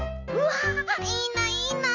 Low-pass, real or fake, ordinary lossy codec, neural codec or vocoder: 7.2 kHz; real; none; none